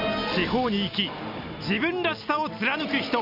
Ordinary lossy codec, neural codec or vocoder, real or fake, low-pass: none; none; real; 5.4 kHz